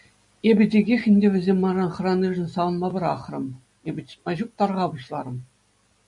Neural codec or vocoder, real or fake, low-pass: none; real; 10.8 kHz